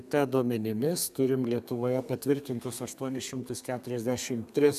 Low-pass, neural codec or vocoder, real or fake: 14.4 kHz; codec, 32 kHz, 1.9 kbps, SNAC; fake